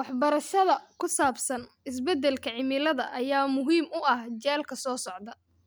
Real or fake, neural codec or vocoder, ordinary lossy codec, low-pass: real; none; none; none